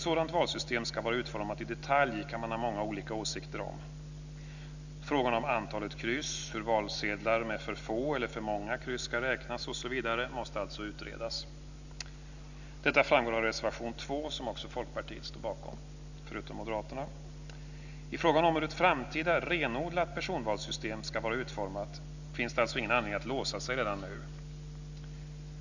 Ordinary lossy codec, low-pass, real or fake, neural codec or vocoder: none; 7.2 kHz; real; none